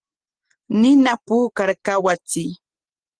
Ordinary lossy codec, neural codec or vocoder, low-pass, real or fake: Opus, 16 kbps; none; 9.9 kHz; real